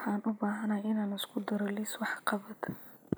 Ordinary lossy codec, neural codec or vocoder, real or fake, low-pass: none; none; real; none